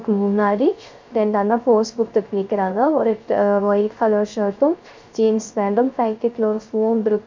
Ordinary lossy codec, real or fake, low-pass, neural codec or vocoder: MP3, 64 kbps; fake; 7.2 kHz; codec, 16 kHz, 0.3 kbps, FocalCodec